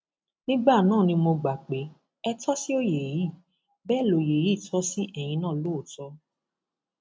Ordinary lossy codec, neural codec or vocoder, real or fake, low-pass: none; none; real; none